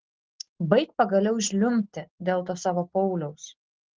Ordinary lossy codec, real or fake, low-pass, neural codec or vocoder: Opus, 16 kbps; real; 7.2 kHz; none